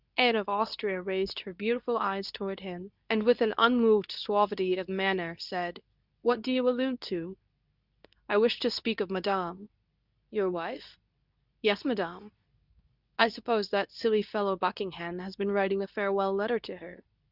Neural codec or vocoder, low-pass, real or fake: codec, 24 kHz, 0.9 kbps, WavTokenizer, medium speech release version 2; 5.4 kHz; fake